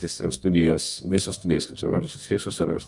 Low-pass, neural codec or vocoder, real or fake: 10.8 kHz; codec, 24 kHz, 0.9 kbps, WavTokenizer, medium music audio release; fake